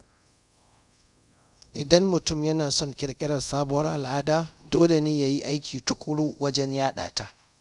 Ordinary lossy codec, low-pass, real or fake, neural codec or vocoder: none; 10.8 kHz; fake; codec, 24 kHz, 0.5 kbps, DualCodec